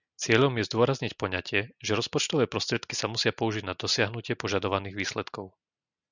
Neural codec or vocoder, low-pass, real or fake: none; 7.2 kHz; real